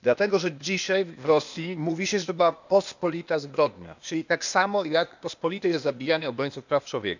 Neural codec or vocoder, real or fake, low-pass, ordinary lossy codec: codec, 16 kHz, 0.8 kbps, ZipCodec; fake; 7.2 kHz; none